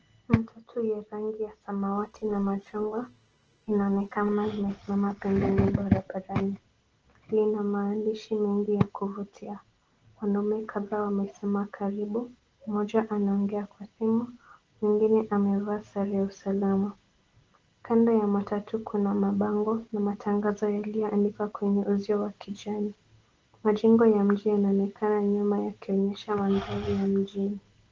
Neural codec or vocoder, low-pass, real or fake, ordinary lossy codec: none; 7.2 kHz; real; Opus, 16 kbps